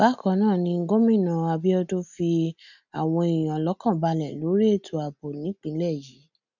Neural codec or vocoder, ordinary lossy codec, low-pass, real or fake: none; none; 7.2 kHz; real